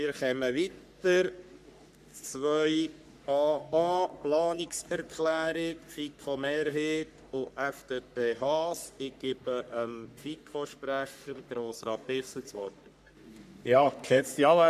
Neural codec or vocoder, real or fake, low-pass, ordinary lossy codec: codec, 44.1 kHz, 3.4 kbps, Pupu-Codec; fake; 14.4 kHz; none